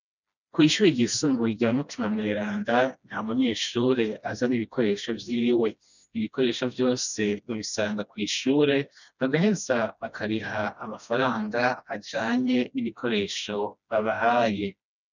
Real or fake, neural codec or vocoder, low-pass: fake; codec, 16 kHz, 1 kbps, FreqCodec, smaller model; 7.2 kHz